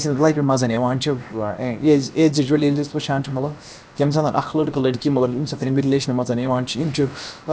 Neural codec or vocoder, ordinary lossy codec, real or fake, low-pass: codec, 16 kHz, about 1 kbps, DyCAST, with the encoder's durations; none; fake; none